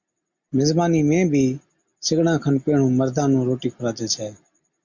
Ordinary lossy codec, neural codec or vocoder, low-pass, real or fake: MP3, 64 kbps; none; 7.2 kHz; real